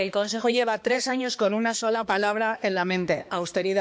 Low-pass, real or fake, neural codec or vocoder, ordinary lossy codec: none; fake; codec, 16 kHz, 2 kbps, X-Codec, HuBERT features, trained on balanced general audio; none